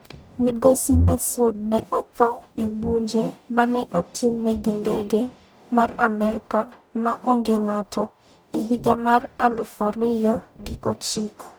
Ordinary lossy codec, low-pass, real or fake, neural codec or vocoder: none; none; fake; codec, 44.1 kHz, 0.9 kbps, DAC